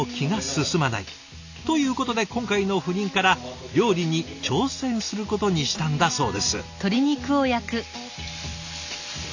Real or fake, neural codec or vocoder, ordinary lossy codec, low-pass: real; none; none; 7.2 kHz